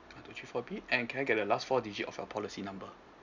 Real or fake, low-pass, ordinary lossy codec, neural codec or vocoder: real; 7.2 kHz; none; none